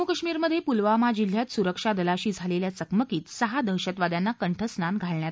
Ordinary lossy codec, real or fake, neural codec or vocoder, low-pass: none; real; none; none